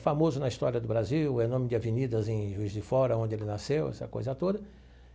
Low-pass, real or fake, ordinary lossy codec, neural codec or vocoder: none; real; none; none